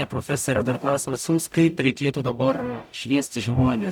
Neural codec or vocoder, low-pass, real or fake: codec, 44.1 kHz, 0.9 kbps, DAC; 19.8 kHz; fake